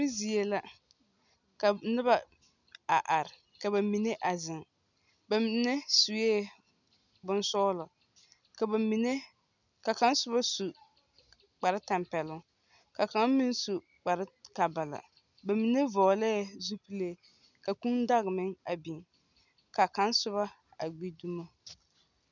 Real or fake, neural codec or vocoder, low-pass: real; none; 7.2 kHz